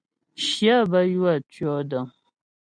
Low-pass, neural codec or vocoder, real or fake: 9.9 kHz; none; real